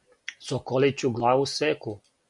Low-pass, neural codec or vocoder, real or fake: 10.8 kHz; none; real